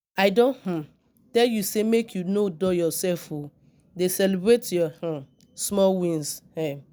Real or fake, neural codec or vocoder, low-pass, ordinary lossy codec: real; none; none; none